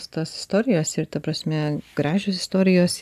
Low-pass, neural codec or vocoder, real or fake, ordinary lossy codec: 14.4 kHz; none; real; AAC, 96 kbps